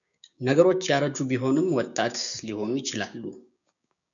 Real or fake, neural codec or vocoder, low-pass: fake; codec, 16 kHz, 6 kbps, DAC; 7.2 kHz